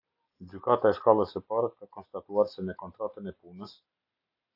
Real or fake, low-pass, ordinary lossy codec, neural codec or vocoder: real; 5.4 kHz; AAC, 48 kbps; none